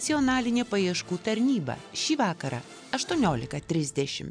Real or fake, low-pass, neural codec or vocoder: real; 9.9 kHz; none